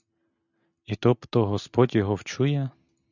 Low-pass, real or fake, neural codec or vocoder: 7.2 kHz; real; none